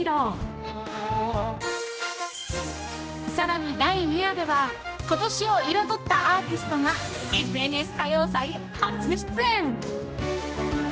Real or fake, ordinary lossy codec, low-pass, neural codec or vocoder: fake; none; none; codec, 16 kHz, 1 kbps, X-Codec, HuBERT features, trained on general audio